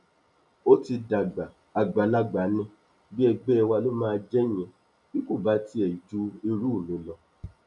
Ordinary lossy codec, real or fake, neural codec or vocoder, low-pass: none; real; none; 10.8 kHz